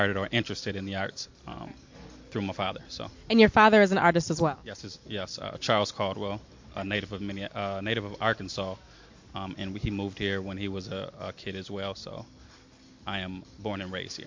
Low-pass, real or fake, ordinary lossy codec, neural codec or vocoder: 7.2 kHz; real; MP3, 48 kbps; none